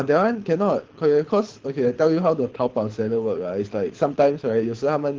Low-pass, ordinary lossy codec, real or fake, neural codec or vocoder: 7.2 kHz; Opus, 16 kbps; fake; codec, 24 kHz, 6 kbps, HILCodec